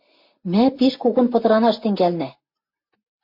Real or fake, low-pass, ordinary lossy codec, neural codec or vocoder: real; 5.4 kHz; MP3, 32 kbps; none